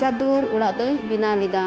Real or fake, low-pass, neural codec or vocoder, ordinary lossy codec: fake; none; codec, 16 kHz, 0.9 kbps, LongCat-Audio-Codec; none